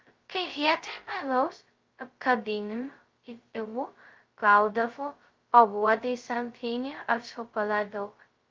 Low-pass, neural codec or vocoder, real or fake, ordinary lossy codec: 7.2 kHz; codec, 16 kHz, 0.2 kbps, FocalCodec; fake; Opus, 32 kbps